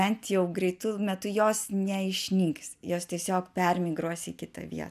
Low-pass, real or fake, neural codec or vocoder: 14.4 kHz; real; none